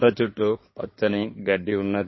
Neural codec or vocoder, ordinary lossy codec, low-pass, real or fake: codec, 16 kHz in and 24 kHz out, 2.2 kbps, FireRedTTS-2 codec; MP3, 24 kbps; 7.2 kHz; fake